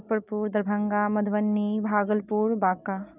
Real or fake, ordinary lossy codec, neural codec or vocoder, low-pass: real; none; none; 3.6 kHz